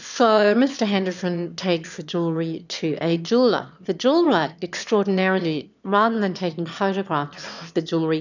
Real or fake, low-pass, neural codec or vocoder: fake; 7.2 kHz; autoencoder, 22.05 kHz, a latent of 192 numbers a frame, VITS, trained on one speaker